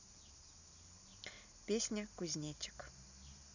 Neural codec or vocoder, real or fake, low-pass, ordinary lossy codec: none; real; 7.2 kHz; none